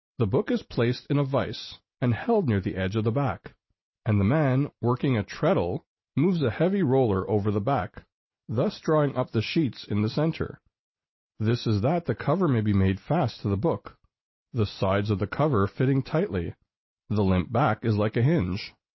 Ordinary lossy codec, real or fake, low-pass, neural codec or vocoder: MP3, 24 kbps; real; 7.2 kHz; none